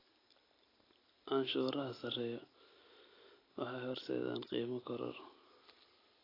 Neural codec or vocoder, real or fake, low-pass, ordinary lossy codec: none; real; 5.4 kHz; AAC, 24 kbps